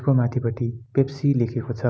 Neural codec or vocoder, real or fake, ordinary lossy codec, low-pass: none; real; none; none